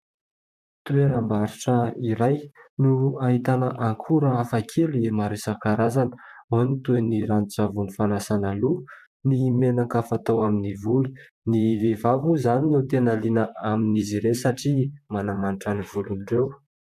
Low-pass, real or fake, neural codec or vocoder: 14.4 kHz; fake; vocoder, 44.1 kHz, 128 mel bands, Pupu-Vocoder